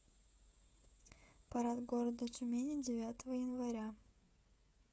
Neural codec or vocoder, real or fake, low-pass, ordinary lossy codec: codec, 16 kHz, 16 kbps, FreqCodec, smaller model; fake; none; none